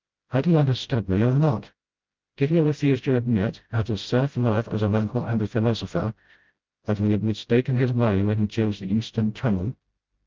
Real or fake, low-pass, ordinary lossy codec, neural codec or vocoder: fake; 7.2 kHz; Opus, 24 kbps; codec, 16 kHz, 0.5 kbps, FreqCodec, smaller model